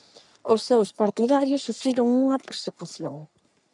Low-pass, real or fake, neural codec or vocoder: 10.8 kHz; fake; codec, 44.1 kHz, 3.4 kbps, Pupu-Codec